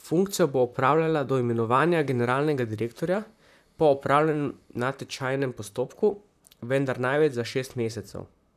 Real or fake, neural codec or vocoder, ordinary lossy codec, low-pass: fake; vocoder, 44.1 kHz, 128 mel bands, Pupu-Vocoder; none; 14.4 kHz